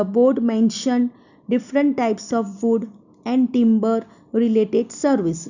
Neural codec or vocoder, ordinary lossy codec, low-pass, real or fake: none; none; 7.2 kHz; real